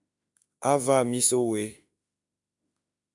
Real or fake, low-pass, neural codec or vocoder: fake; 10.8 kHz; autoencoder, 48 kHz, 32 numbers a frame, DAC-VAE, trained on Japanese speech